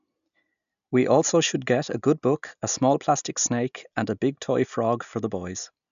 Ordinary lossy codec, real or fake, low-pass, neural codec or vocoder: none; real; 7.2 kHz; none